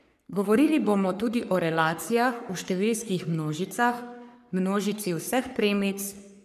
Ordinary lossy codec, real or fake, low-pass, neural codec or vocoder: none; fake; 14.4 kHz; codec, 44.1 kHz, 3.4 kbps, Pupu-Codec